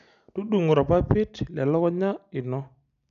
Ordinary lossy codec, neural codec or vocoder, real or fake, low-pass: none; none; real; 7.2 kHz